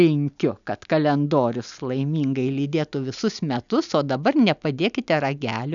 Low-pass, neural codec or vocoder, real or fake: 7.2 kHz; none; real